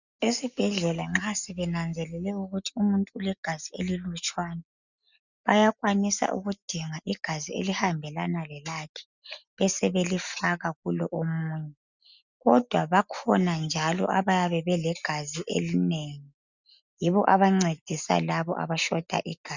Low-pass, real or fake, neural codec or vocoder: 7.2 kHz; real; none